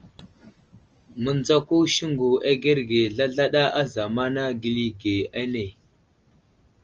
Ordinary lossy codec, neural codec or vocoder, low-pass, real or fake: Opus, 24 kbps; none; 7.2 kHz; real